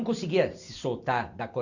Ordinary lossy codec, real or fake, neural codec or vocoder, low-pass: none; real; none; 7.2 kHz